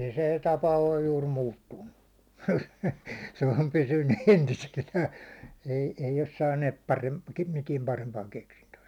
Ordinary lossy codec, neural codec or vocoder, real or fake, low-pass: none; none; real; 19.8 kHz